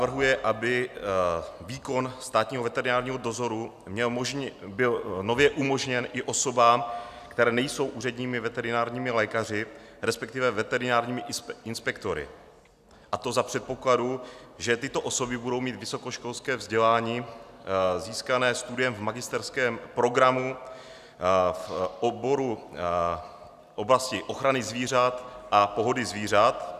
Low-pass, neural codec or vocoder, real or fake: 14.4 kHz; none; real